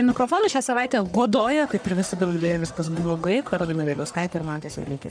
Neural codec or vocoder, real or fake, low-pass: codec, 44.1 kHz, 1.7 kbps, Pupu-Codec; fake; 9.9 kHz